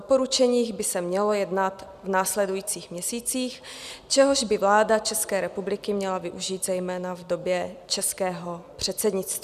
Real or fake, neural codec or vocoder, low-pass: real; none; 14.4 kHz